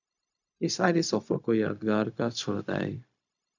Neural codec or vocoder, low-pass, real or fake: codec, 16 kHz, 0.4 kbps, LongCat-Audio-Codec; 7.2 kHz; fake